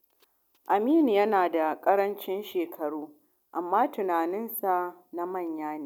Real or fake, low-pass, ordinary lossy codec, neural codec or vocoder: real; 19.8 kHz; none; none